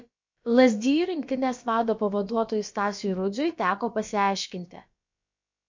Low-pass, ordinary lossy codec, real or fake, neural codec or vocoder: 7.2 kHz; MP3, 48 kbps; fake; codec, 16 kHz, about 1 kbps, DyCAST, with the encoder's durations